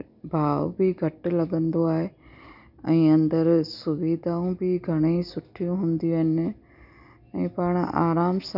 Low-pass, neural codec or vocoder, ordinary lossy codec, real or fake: 5.4 kHz; none; none; real